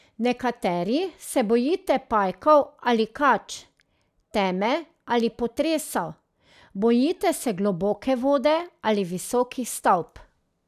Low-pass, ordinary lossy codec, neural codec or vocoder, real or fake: 14.4 kHz; none; none; real